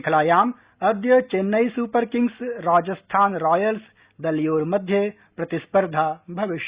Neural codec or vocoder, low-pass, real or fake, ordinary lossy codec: none; 3.6 kHz; real; Opus, 64 kbps